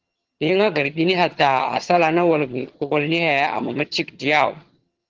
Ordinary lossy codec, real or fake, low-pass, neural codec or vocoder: Opus, 16 kbps; fake; 7.2 kHz; vocoder, 22.05 kHz, 80 mel bands, HiFi-GAN